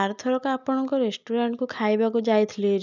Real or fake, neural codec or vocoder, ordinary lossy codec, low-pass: real; none; none; 7.2 kHz